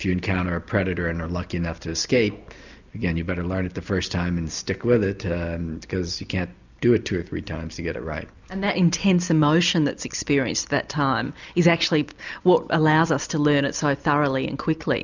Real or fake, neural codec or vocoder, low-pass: real; none; 7.2 kHz